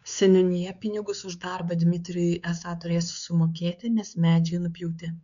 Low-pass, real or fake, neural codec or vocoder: 7.2 kHz; fake; codec, 16 kHz, 4 kbps, X-Codec, WavLM features, trained on Multilingual LibriSpeech